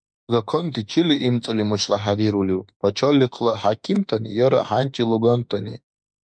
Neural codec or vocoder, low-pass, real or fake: autoencoder, 48 kHz, 32 numbers a frame, DAC-VAE, trained on Japanese speech; 9.9 kHz; fake